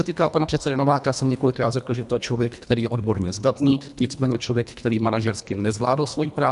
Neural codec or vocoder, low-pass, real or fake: codec, 24 kHz, 1.5 kbps, HILCodec; 10.8 kHz; fake